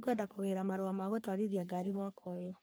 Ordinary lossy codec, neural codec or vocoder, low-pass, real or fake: none; codec, 44.1 kHz, 3.4 kbps, Pupu-Codec; none; fake